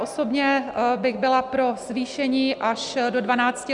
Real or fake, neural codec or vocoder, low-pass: real; none; 10.8 kHz